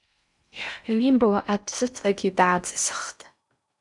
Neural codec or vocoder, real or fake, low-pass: codec, 16 kHz in and 24 kHz out, 0.6 kbps, FocalCodec, streaming, 4096 codes; fake; 10.8 kHz